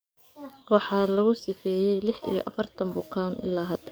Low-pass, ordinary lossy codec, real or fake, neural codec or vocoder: none; none; fake; codec, 44.1 kHz, 7.8 kbps, DAC